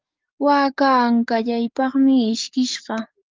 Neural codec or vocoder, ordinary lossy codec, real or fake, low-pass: codec, 44.1 kHz, 7.8 kbps, DAC; Opus, 24 kbps; fake; 7.2 kHz